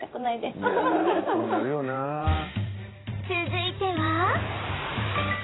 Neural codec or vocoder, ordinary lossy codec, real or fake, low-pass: vocoder, 44.1 kHz, 128 mel bands every 512 samples, BigVGAN v2; AAC, 16 kbps; fake; 7.2 kHz